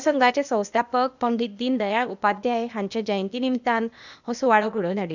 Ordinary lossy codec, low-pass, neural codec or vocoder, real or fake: none; 7.2 kHz; codec, 16 kHz, 0.8 kbps, ZipCodec; fake